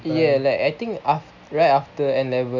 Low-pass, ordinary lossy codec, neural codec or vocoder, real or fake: 7.2 kHz; none; none; real